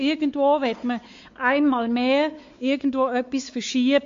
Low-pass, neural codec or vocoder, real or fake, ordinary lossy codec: 7.2 kHz; codec, 16 kHz, 4 kbps, X-Codec, WavLM features, trained on Multilingual LibriSpeech; fake; MP3, 48 kbps